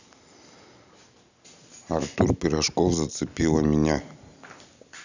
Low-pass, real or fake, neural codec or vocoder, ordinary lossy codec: 7.2 kHz; real; none; none